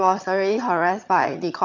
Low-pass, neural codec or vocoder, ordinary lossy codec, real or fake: 7.2 kHz; vocoder, 22.05 kHz, 80 mel bands, HiFi-GAN; none; fake